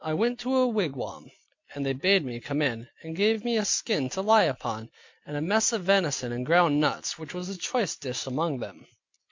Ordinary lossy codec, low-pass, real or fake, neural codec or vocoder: MP3, 48 kbps; 7.2 kHz; real; none